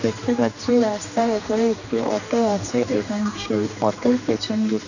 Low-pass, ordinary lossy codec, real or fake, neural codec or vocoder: 7.2 kHz; none; fake; codec, 16 kHz, 2 kbps, X-Codec, HuBERT features, trained on general audio